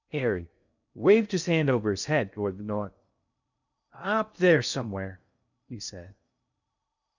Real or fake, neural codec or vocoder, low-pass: fake; codec, 16 kHz in and 24 kHz out, 0.6 kbps, FocalCodec, streaming, 2048 codes; 7.2 kHz